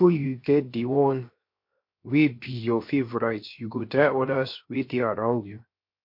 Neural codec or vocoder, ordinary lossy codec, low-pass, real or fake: codec, 16 kHz, 0.7 kbps, FocalCodec; MP3, 32 kbps; 5.4 kHz; fake